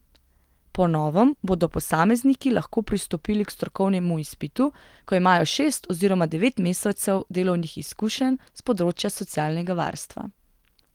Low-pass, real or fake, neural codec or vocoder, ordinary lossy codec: 19.8 kHz; real; none; Opus, 24 kbps